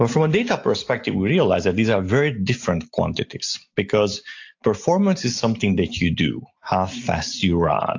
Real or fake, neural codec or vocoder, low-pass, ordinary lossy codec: real; none; 7.2 kHz; AAC, 48 kbps